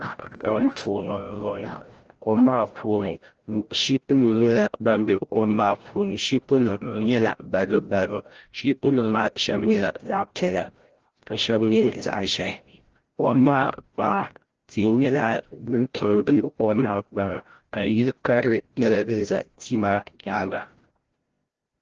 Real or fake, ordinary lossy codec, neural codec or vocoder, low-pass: fake; Opus, 16 kbps; codec, 16 kHz, 0.5 kbps, FreqCodec, larger model; 7.2 kHz